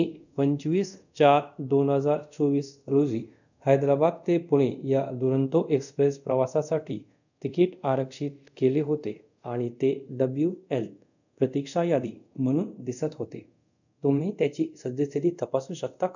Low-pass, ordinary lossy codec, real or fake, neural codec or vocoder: 7.2 kHz; none; fake; codec, 24 kHz, 0.5 kbps, DualCodec